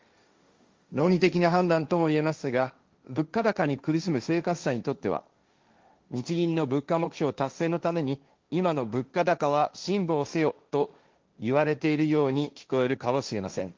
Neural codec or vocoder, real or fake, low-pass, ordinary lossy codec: codec, 16 kHz, 1.1 kbps, Voila-Tokenizer; fake; 7.2 kHz; Opus, 32 kbps